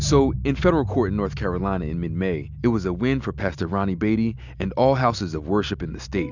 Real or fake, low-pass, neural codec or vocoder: real; 7.2 kHz; none